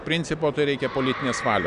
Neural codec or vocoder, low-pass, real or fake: none; 10.8 kHz; real